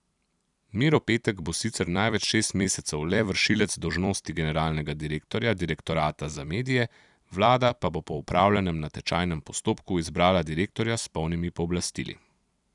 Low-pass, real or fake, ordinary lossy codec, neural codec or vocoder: 10.8 kHz; fake; none; vocoder, 44.1 kHz, 128 mel bands every 256 samples, BigVGAN v2